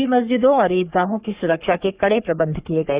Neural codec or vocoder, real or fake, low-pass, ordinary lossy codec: codec, 44.1 kHz, 3.4 kbps, Pupu-Codec; fake; 3.6 kHz; Opus, 64 kbps